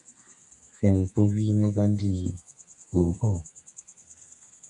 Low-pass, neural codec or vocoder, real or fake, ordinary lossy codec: 10.8 kHz; codec, 32 kHz, 1.9 kbps, SNAC; fake; MP3, 64 kbps